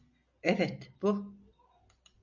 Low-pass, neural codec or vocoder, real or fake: 7.2 kHz; none; real